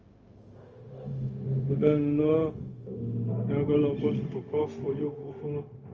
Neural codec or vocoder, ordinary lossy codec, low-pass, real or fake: codec, 16 kHz, 0.4 kbps, LongCat-Audio-Codec; Opus, 24 kbps; 7.2 kHz; fake